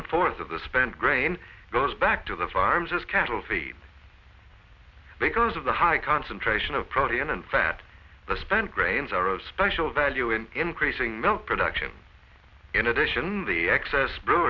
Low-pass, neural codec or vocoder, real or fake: 7.2 kHz; none; real